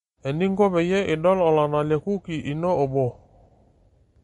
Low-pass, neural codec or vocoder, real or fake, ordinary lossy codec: 19.8 kHz; none; real; MP3, 48 kbps